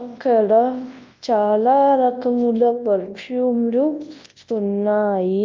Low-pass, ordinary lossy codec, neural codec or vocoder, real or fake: 7.2 kHz; Opus, 32 kbps; codec, 24 kHz, 0.9 kbps, WavTokenizer, large speech release; fake